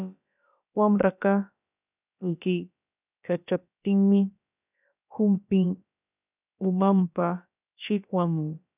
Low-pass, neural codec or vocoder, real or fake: 3.6 kHz; codec, 16 kHz, about 1 kbps, DyCAST, with the encoder's durations; fake